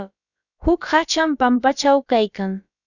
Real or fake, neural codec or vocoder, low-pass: fake; codec, 16 kHz, about 1 kbps, DyCAST, with the encoder's durations; 7.2 kHz